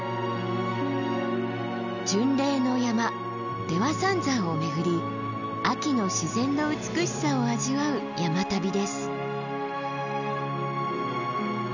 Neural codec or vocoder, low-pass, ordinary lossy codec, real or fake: none; 7.2 kHz; none; real